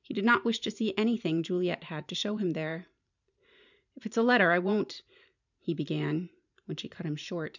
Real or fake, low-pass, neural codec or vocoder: fake; 7.2 kHz; vocoder, 44.1 kHz, 128 mel bands every 512 samples, BigVGAN v2